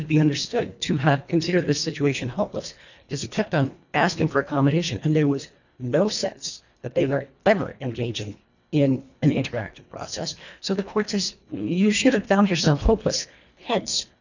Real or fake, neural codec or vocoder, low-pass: fake; codec, 24 kHz, 1.5 kbps, HILCodec; 7.2 kHz